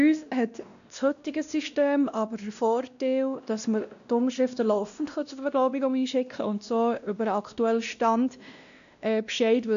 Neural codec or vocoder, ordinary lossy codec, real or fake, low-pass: codec, 16 kHz, 1 kbps, X-Codec, WavLM features, trained on Multilingual LibriSpeech; none; fake; 7.2 kHz